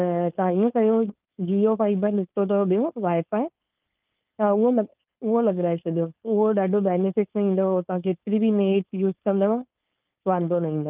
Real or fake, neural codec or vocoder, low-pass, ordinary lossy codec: fake; codec, 16 kHz, 4.8 kbps, FACodec; 3.6 kHz; Opus, 32 kbps